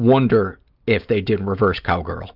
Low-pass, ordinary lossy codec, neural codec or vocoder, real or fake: 5.4 kHz; Opus, 24 kbps; none; real